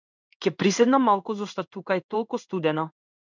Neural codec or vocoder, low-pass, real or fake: codec, 16 kHz in and 24 kHz out, 1 kbps, XY-Tokenizer; 7.2 kHz; fake